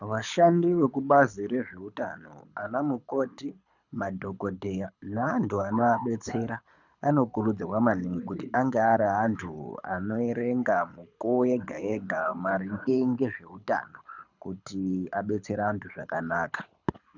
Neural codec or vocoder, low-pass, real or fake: codec, 24 kHz, 6 kbps, HILCodec; 7.2 kHz; fake